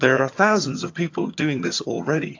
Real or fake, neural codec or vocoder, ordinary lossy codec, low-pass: fake; vocoder, 22.05 kHz, 80 mel bands, HiFi-GAN; AAC, 48 kbps; 7.2 kHz